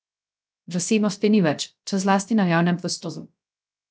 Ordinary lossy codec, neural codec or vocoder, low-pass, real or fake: none; codec, 16 kHz, 0.3 kbps, FocalCodec; none; fake